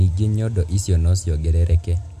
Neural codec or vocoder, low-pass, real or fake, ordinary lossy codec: none; 14.4 kHz; real; MP3, 64 kbps